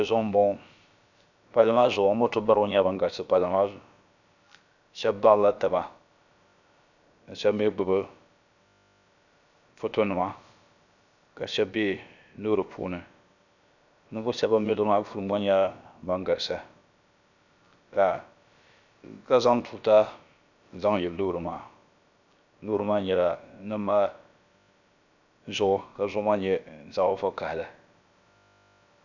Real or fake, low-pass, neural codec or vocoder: fake; 7.2 kHz; codec, 16 kHz, about 1 kbps, DyCAST, with the encoder's durations